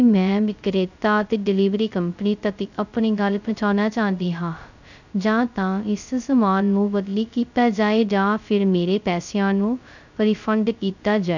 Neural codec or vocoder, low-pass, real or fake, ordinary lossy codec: codec, 16 kHz, 0.2 kbps, FocalCodec; 7.2 kHz; fake; none